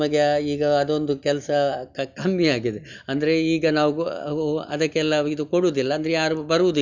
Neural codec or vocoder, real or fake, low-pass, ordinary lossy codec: none; real; 7.2 kHz; none